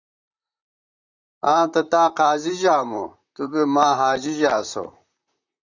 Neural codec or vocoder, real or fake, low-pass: vocoder, 44.1 kHz, 128 mel bands, Pupu-Vocoder; fake; 7.2 kHz